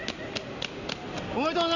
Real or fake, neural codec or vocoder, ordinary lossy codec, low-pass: real; none; none; 7.2 kHz